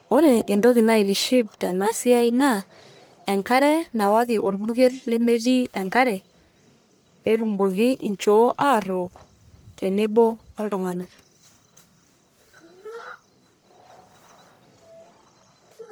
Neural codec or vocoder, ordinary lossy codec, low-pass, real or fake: codec, 44.1 kHz, 1.7 kbps, Pupu-Codec; none; none; fake